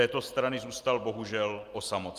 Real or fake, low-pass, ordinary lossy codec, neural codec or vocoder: real; 14.4 kHz; Opus, 32 kbps; none